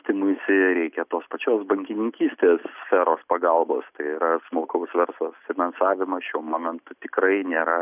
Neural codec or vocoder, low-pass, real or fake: none; 3.6 kHz; real